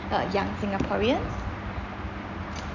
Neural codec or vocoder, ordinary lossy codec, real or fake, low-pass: none; none; real; 7.2 kHz